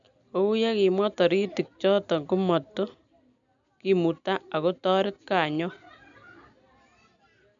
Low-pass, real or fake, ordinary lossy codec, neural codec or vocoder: 7.2 kHz; real; none; none